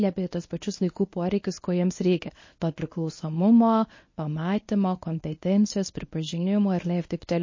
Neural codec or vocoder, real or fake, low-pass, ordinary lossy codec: codec, 24 kHz, 0.9 kbps, WavTokenizer, medium speech release version 1; fake; 7.2 kHz; MP3, 32 kbps